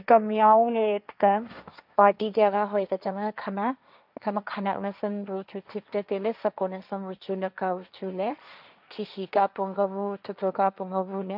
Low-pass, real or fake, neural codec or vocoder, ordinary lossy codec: 5.4 kHz; fake; codec, 16 kHz, 1.1 kbps, Voila-Tokenizer; none